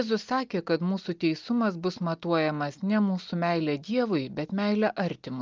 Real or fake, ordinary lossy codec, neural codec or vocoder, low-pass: real; Opus, 32 kbps; none; 7.2 kHz